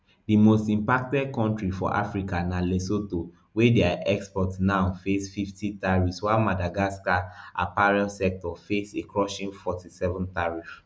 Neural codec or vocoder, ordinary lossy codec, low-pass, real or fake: none; none; none; real